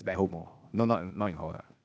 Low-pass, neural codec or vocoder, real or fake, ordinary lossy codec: none; codec, 16 kHz, 0.8 kbps, ZipCodec; fake; none